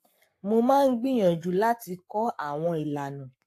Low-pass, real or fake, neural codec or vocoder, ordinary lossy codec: 14.4 kHz; fake; codec, 44.1 kHz, 7.8 kbps, Pupu-Codec; AAC, 64 kbps